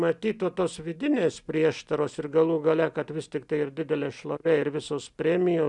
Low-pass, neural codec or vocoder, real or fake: 10.8 kHz; none; real